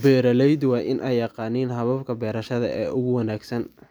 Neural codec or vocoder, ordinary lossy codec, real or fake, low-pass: none; none; real; none